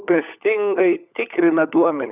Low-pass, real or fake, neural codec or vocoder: 3.6 kHz; fake; codec, 16 kHz, 16 kbps, FunCodec, trained on LibriTTS, 50 frames a second